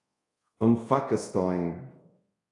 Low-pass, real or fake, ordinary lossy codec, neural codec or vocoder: 10.8 kHz; fake; AAC, 48 kbps; codec, 24 kHz, 0.5 kbps, DualCodec